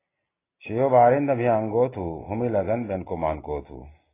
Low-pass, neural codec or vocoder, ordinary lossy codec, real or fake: 3.6 kHz; none; AAC, 16 kbps; real